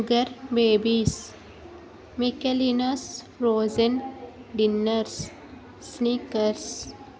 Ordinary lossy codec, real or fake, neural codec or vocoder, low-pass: none; real; none; none